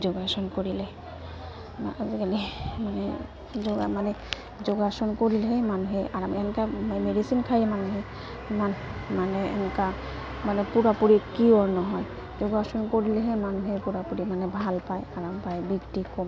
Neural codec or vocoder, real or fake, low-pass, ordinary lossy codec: none; real; none; none